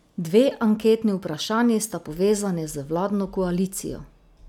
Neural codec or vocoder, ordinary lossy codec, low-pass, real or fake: none; none; 19.8 kHz; real